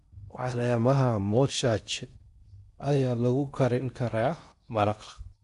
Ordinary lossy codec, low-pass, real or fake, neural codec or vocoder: none; 10.8 kHz; fake; codec, 16 kHz in and 24 kHz out, 0.6 kbps, FocalCodec, streaming, 4096 codes